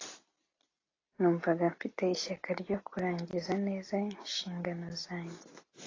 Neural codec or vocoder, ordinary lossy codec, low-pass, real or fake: none; AAC, 32 kbps; 7.2 kHz; real